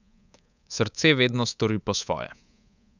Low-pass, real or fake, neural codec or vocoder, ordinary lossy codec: 7.2 kHz; fake; codec, 24 kHz, 3.1 kbps, DualCodec; none